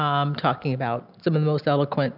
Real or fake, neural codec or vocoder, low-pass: real; none; 5.4 kHz